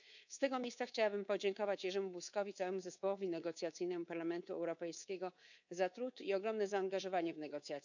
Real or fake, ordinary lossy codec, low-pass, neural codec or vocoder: fake; none; 7.2 kHz; codec, 24 kHz, 3.1 kbps, DualCodec